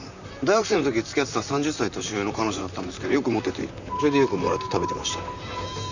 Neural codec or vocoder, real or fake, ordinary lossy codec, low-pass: vocoder, 44.1 kHz, 128 mel bands, Pupu-Vocoder; fake; none; 7.2 kHz